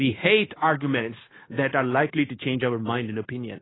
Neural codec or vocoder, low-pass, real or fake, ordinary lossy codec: codec, 16 kHz, 0.9 kbps, LongCat-Audio-Codec; 7.2 kHz; fake; AAC, 16 kbps